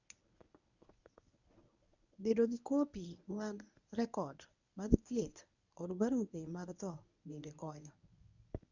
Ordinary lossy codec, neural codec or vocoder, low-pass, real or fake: none; codec, 24 kHz, 0.9 kbps, WavTokenizer, medium speech release version 1; 7.2 kHz; fake